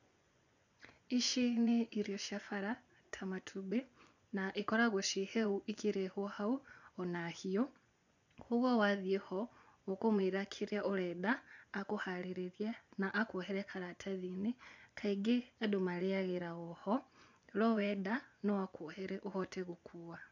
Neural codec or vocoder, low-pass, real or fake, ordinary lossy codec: vocoder, 24 kHz, 100 mel bands, Vocos; 7.2 kHz; fake; none